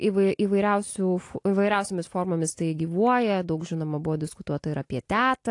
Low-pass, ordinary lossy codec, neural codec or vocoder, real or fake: 10.8 kHz; AAC, 48 kbps; none; real